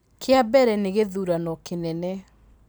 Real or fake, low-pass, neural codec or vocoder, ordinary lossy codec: real; none; none; none